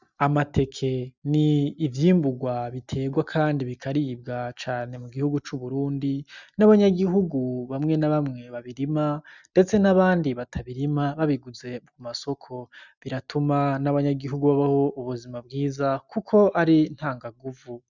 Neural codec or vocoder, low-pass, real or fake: none; 7.2 kHz; real